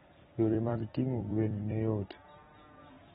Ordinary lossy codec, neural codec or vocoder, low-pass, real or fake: AAC, 16 kbps; vocoder, 44.1 kHz, 128 mel bands every 256 samples, BigVGAN v2; 19.8 kHz; fake